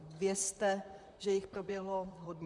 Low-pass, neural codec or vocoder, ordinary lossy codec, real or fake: 10.8 kHz; vocoder, 44.1 kHz, 128 mel bands, Pupu-Vocoder; MP3, 96 kbps; fake